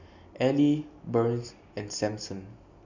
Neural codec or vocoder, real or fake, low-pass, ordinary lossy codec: none; real; 7.2 kHz; none